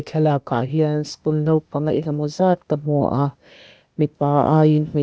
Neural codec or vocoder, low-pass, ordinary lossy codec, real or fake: codec, 16 kHz, 0.8 kbps, ZipCodec; none; none; fake